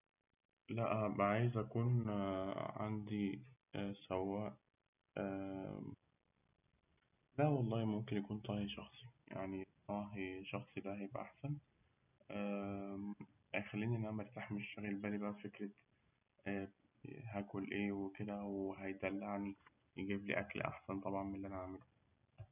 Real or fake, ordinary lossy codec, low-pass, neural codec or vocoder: real; none; 3.6 kHz; none